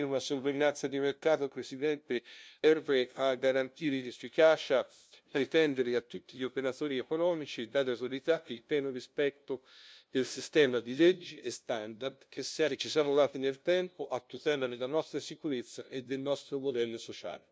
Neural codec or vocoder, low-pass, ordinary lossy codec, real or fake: codec, 16 kHz, 0.5 kbps, FunCodec, trained on LibriTTS, 25 frames a second; none; none; fake